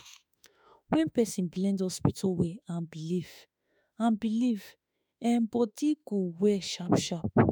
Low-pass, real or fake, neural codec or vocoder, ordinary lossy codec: none; fake; autoencoder, 48 kHz, 32 numbers a frame, DAC-VAE, trained on Japanese speech; none